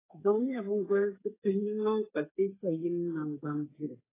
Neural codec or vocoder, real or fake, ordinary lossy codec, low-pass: codec, 32 kHz, 1.9 kbps, SNAC; fake; AAC, 16 kbps; 3.6 kHz